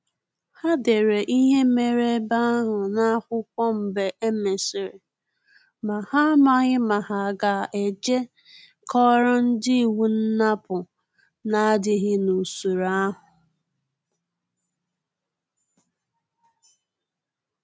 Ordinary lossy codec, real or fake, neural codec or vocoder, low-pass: none; real; none; none